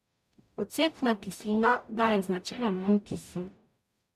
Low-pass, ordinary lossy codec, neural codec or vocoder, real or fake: 14.4 kHz; none; codec, 44.1 kHz, 0.9 kbps, DAC; fake